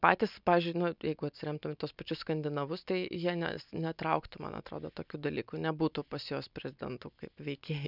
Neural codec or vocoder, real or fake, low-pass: none; real; 5.4 kHz